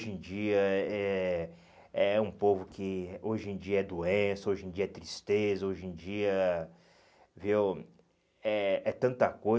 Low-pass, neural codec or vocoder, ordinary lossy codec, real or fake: none; none; none; real